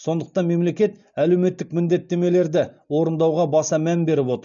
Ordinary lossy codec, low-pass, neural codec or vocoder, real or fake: none; 7.2 kHz; none; real